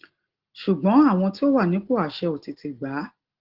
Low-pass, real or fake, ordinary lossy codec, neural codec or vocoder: 5.4 kHz; real; Opus, 16 kbps; none